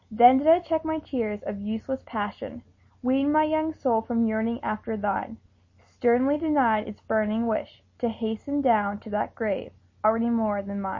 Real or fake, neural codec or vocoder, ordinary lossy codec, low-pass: real; none; MP3, 32 kbps; 7.2 kHz